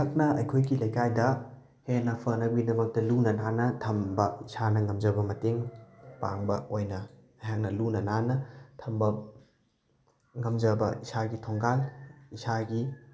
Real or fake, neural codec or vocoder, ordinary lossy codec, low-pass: real; none; none; none